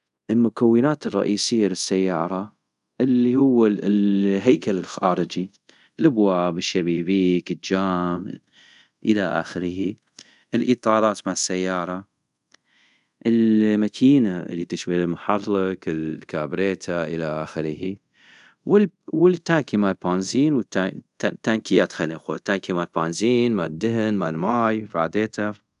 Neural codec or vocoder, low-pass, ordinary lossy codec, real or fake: codec, 24 kHz, 0.5 kbps, DualCodec; 10.8 kHz; none; fake